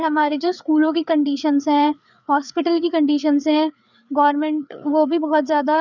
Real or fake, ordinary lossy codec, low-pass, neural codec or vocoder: fake; none; 7.2 kHz; codec, 16 kHz, 4 kbps, FreqCodec, larger model